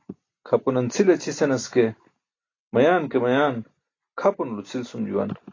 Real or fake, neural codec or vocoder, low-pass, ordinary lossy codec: real; none; 7.2 kHz; AAC, 32 kbps